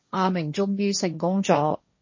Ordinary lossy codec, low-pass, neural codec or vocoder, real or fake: MP3, 32 kbps; 7.2 kHz; codec, 16 kHz, 1.1 kbps, Voila-Tokenizer; fake